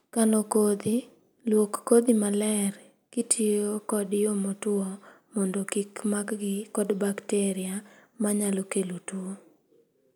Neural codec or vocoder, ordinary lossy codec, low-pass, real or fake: none; none; none; real